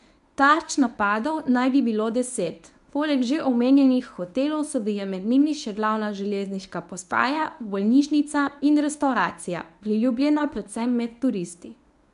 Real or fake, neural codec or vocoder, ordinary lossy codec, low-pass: fake; codec, 24 kHz, 0.9 kbps, WavTokenizer, medium speech release version 1; none; 10.8 kHz